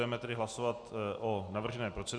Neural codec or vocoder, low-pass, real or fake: none; 9.9 kHz; real